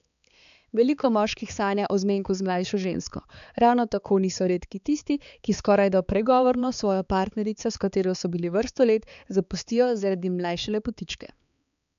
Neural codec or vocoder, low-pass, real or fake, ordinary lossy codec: codec, 16 kHz, 4 kbps, X-Codec, HuBERT features, trained on balanced general audio; 7.2 kHz; fake; none